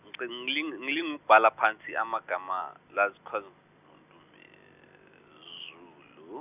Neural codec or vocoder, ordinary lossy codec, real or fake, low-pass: none; none; real; 3.6 kHz